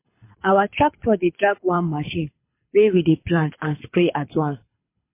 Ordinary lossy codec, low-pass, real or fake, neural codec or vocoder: MP3, 24 kbps; 3.6 kHz; fake; codec, 24 kHz, 6 kbps, HILCodec